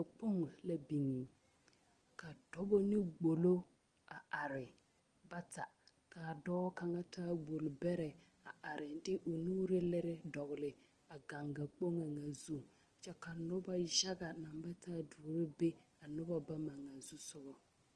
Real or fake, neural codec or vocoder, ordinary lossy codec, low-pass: real; none; Opus, 24 kbps; 9.9 kHz